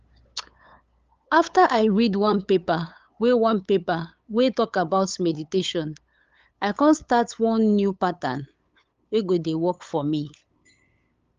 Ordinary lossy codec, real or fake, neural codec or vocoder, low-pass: Opus, 24 kbps; fake; codec, 16 kHz, 8 kbps, FunCodec, trained on LibriTTS, 25 frames a second; 7.2 kHz